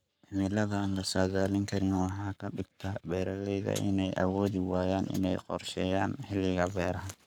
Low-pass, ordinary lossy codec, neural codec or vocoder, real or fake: none; none; codec, 44.1 kHz, 7.8 kbps, Pupu-Codec; fake